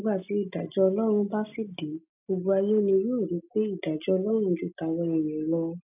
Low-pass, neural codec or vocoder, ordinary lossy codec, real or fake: 3.6 kHz; none; none; real